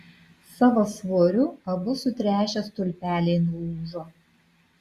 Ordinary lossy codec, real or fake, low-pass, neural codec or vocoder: Opus, 64 kbps; real; 14.4 kHz; none